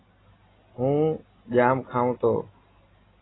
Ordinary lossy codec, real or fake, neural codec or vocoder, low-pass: AAC, 16 kbps; real; none; 7.2 kHz